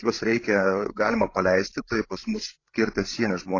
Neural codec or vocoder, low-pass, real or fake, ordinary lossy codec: codec, 16 kHz, 16 kbps, FreqCodec, larger model; 7.2 kHz; fake; AAC, 32 kbps